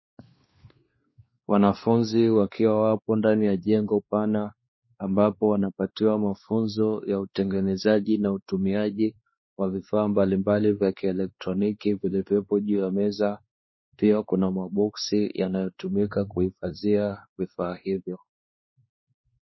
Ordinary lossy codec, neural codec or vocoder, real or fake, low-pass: MP3, 24 kbps; codec, 16 kHz, 2 kbps, X-Codec, WavLM features, trained on Multilingual LibriSpeech; fake; 7.2 kHz